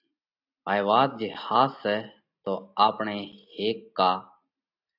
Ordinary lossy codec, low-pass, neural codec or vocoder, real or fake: AAC, 48 kbps; 5.4 kHz; none; real